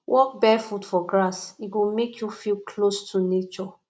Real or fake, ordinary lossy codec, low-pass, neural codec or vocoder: real; none; none; none